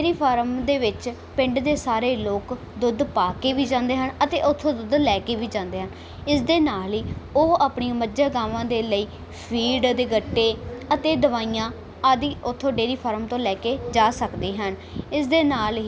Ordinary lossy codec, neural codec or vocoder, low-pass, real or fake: none; none; none; real